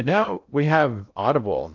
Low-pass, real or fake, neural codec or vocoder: 7.2 kHz; fake; codec, 16 kHz in and 24 kHz out, 0.6 kbps, FocalCodec, streaming, 2048 codes